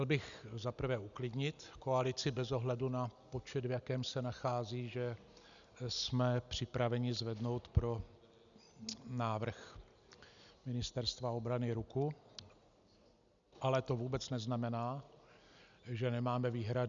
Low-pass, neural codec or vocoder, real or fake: 7.2 kHz; none; real